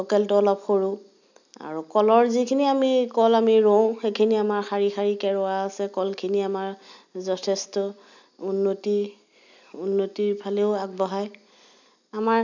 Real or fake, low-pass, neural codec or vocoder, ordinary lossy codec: real; 7.2 kHz; none; none